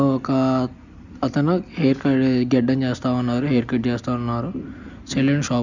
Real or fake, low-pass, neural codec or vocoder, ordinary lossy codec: real; 7.2 kHz; none; none